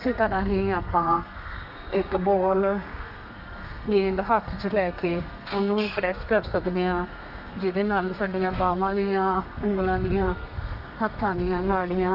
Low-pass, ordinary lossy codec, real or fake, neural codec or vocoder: 5.4 kHz; none; fake; codec, 32 kHz, 1.9 kbps, SNAC